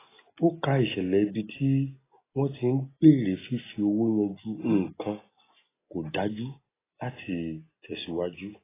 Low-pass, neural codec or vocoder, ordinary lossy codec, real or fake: 3.6 kHz; none; AAC, 16 kbps; real